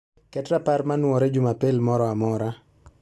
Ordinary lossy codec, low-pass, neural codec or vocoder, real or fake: none; none; none; real